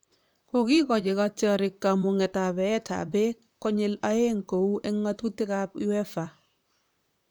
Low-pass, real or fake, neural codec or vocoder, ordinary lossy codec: none; fake; vocoder, 44.1 kHz, 128 mel bands, Pupu-Vocoder; none